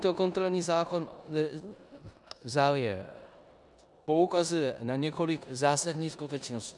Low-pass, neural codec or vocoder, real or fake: 10.8 kHz; codec, 16 kHz in and 24 kHz out, 0.9 kbps, LongCat-Audio-Codec, four codebook decoder; fake